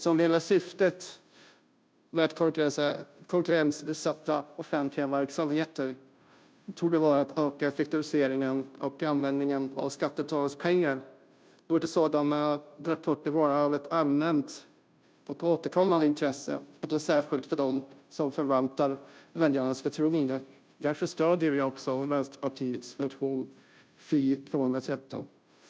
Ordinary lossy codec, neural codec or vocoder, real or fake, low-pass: none; codec, 16 kHz, 0.5 kbps, FunCodec, trained on Chinese and English, 25 frames a second; fake; none